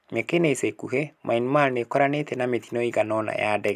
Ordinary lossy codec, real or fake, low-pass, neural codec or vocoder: none; real; 14.4 kHz; none